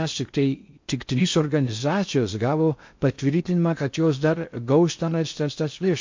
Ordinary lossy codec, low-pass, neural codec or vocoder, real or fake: MP3, 48 kbps; 7.2 kHz; codec, 16 kHz in and 24 kHz out, 0.6 kbps, FocalCodec, streaming, 2048 codes; fake